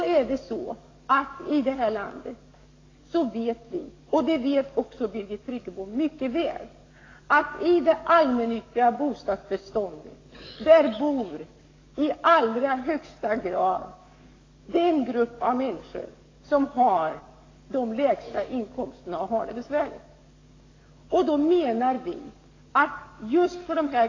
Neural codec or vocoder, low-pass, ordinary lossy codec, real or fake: codec, 44.1 kHz, 7.8 kbps, DAC; 7.2 kHz; AAC, 32 kbps; fake